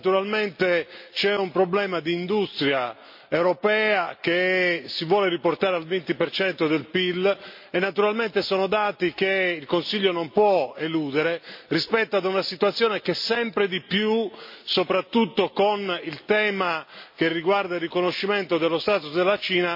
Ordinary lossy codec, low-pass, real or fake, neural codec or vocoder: MP3, 32 kbps; 5.4 kHz; real; none